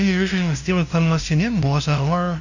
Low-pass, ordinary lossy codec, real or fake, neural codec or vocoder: 7.2 kHz; none; fake; codec, 16 kHz, 0.5 kbps, FunCodec, trained on LibriTTS, 25 frames a second